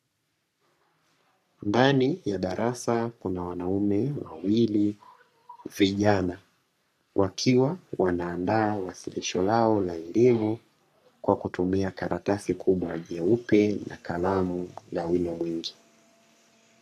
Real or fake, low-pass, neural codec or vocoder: fake; 14.4 kHz; codec, 44.1 kHz, 3.4 kbps, Pupu-Codec